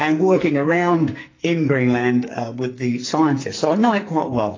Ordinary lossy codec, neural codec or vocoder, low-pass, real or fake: AAC, 32 kbps; codec, 44.1 kHz, 2.6 kbps, SNAC; 7.2 kHz; fake